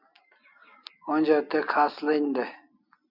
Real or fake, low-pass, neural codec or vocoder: real; 5.4 kHz; none